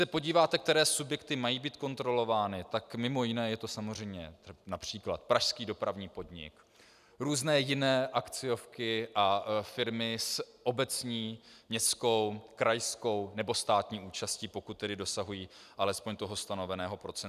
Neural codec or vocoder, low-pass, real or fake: none; 14.4 kHz; real